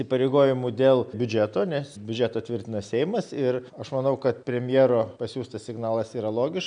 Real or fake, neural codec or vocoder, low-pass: real; none; 9.9 kHz